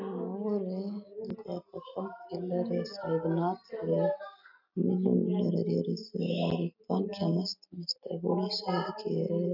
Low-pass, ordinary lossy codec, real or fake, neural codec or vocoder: 5.4 kHz; none; real; none